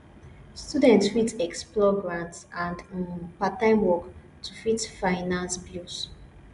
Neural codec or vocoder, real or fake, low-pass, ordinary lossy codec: none; real; 10.8 kHz; none